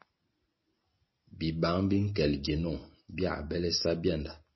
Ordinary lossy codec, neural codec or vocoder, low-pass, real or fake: MP3, 24 kbps; none; 7.2 kHz; real